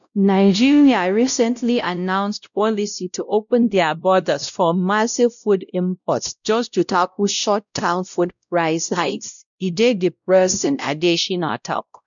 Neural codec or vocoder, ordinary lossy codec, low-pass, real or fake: codec, 16 kHz, 0.5 kbps, X-Codec, WavLM features, trained on Multilingual LibriSpeech; none; 7.2 kHz; fake